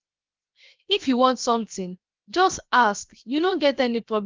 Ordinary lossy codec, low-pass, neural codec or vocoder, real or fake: Opus, 24 kbps; 7.2 kHz; codec, 16 kHz, 0.7 kbps, FocalCodec; fake